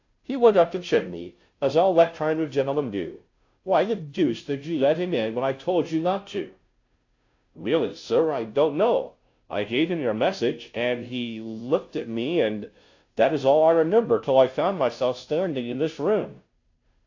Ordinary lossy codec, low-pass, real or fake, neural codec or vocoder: AAC, 48 kbps; 7.2 kHz; fake; codec, 16 kHz, 0.5 kbps, FunCodec, trained on Chinese and English, 25 frames a second